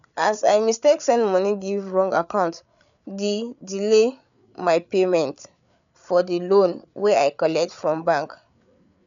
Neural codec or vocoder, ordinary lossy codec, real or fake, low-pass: codec, 16 kHz, 8 kbps, FreqCodec, larger model; none; fake; 7.2 kHz